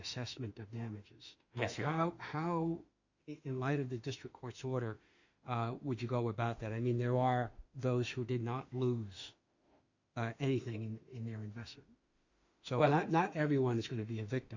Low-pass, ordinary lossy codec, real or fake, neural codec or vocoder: 7.2 kHz; Opus, 64 kbps; fake; autoencoder, 48 kHz, 32 numbers a frame, DAC-VAE, trained on Japanese speech